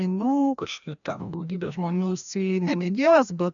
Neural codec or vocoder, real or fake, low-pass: codec, 16 kHz, 1 kbps, FreqCodec, larger model; fake; 7.2 kHz